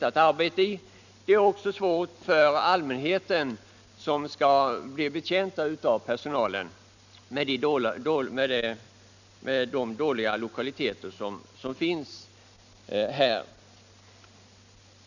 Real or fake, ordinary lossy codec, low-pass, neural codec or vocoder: real; none; 7.2 kHz; none